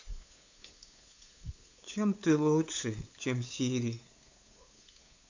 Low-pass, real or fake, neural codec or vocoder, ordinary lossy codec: 7.2 kHz; fake; codec, 16 kHz, 8 kbps, FunCodec, trained on LibriTTS, 25 frames a second; AAC, 48 kbps